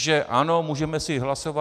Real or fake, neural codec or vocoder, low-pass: real; none; 14.4 kHz